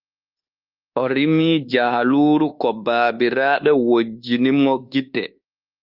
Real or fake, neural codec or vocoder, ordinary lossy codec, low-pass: fake; codec, 24 kHz, 1.2 kbps, DualCodec; Opus, 32 kbps; 5.4 kHz